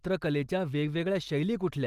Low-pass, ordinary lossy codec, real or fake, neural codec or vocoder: 14.4 kHz; Opus, 24 kbps; real; none